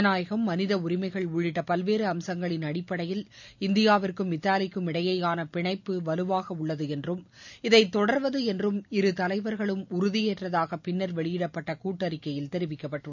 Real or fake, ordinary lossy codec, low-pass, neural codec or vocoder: real; none; 7.2 kHz; none